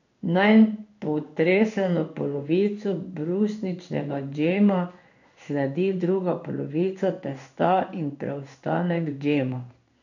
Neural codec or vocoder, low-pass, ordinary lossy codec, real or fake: codec, 16 kHz in and 24 kHz out, 1 kbps, XY-Tokenizer; 7.2 kHz; none; fake